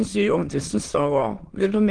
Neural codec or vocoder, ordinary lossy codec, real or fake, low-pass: autoencoder, 22.05 kHz, a latent of 192 numbers a frame, VITS, trained on many speakers; Opus, 16 kbps; fake; 9.9 kHz